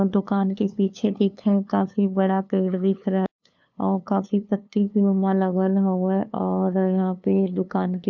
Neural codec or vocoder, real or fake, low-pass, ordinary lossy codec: codec, 16 kHz, 2 kbps, FunCodec, trained on LibriTTS, 25 frames a second; fake; 7.2 kHz; MP3, 64 kbps